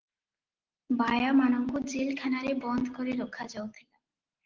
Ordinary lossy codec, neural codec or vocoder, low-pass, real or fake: Opus, 16 kbps; none; 7.2 kHz; real